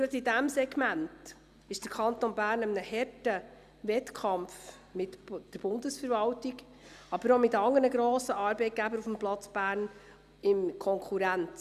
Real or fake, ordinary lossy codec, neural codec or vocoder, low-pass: real; Opus, 64 kbps; none; 14.4 kHz